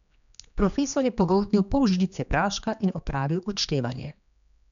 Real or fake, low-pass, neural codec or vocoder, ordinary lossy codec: fake; 7.2 kHz; codec, 16 kHz, 2 kbps, X-Codec, HuBERT features, trained on general audio; none